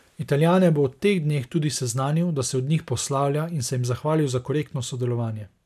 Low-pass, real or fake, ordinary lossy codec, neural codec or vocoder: 14.4 kHz; real; none; none